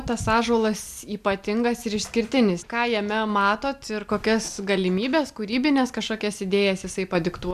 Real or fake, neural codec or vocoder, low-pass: real; none; 14.4 kHz